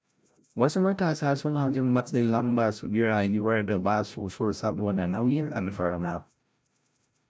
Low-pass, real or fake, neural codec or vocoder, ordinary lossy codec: none; fake; codec, 16 kHz, 0.5 kbps, FreqCodec, larger model; none